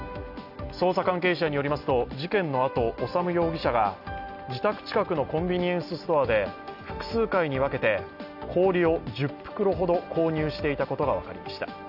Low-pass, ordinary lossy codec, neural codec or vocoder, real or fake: 5.4 kHz; none; none; real